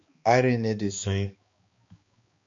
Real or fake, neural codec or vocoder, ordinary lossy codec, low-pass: fake; codec, 16 kHz, 2 kbps, X-Codec, HuBERT features, trained on balanced general audio; MP3, 48 kbps; 7.2 kHz